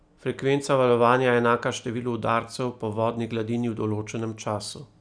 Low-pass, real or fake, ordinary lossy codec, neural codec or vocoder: 9.9 kHz; real; none; none